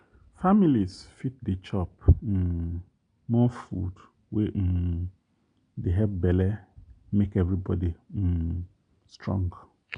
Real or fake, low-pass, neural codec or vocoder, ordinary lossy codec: real; 9.9 kHz; none; none